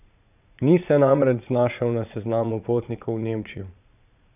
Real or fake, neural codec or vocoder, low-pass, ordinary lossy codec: fake; vocoder, 22.05 kHz, 80 mel bands, Vocos; 3.6 kHz; none